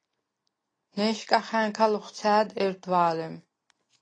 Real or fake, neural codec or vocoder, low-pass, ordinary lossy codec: real; none; 9.9 kHz; AAC, 32 kbps